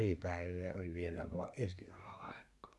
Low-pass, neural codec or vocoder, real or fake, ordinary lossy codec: none; codec, 24 kHz, 1 kbps, SNAC; fake; none